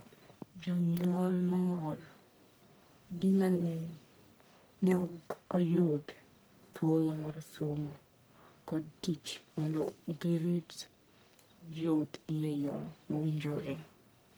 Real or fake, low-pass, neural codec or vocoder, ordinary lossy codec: fake; none; codec, 44.1 kHz, 1.7 kbps, Pupu-Codec; none